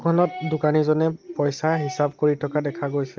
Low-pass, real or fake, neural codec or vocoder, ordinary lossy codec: 7.2 kHz; real; none; Opus, 32 kbps